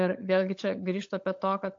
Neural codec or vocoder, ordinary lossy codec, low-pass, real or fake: codec, 16 kHz, 16 kbps, FunCodec, trained on LibriTTS, 50 frames a second; AAC, 48 kbps; 7.2 kHz; fake